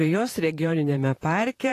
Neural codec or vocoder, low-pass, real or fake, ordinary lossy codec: vocoder, 44.1 kHz, 128 mel bands, Pupu-Vocoder; 14.4 kHz; fake; AAC, 48 kbps